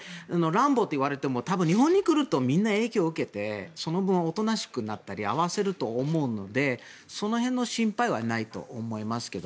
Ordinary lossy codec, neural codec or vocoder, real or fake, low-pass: none; none; real; none